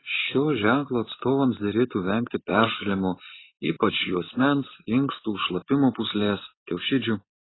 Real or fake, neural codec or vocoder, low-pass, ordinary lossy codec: real; none; 7.2 kHz; AAC, 16 kbps